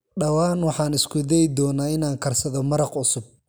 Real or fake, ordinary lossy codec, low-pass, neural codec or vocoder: real; none; none; none